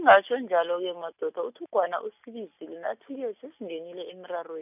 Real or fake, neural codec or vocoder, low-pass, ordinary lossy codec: real; none; 3.6 kHz; none